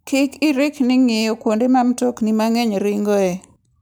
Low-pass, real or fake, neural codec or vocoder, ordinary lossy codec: none; real; none; none